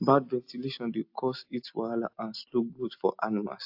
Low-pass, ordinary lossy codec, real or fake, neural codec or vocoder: 5.4 kHz; AAC, 48 kbps; real; none